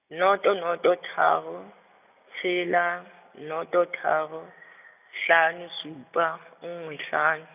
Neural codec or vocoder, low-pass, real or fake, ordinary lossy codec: none; 3.6 kHz; real; none